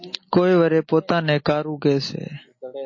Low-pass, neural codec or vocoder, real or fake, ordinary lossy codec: 7.2 kHz; none; real; MP3, 32 kbps